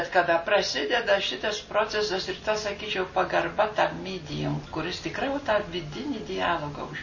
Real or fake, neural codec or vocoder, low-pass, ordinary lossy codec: real; none; 7.2 kHz; MP3, 32 kbps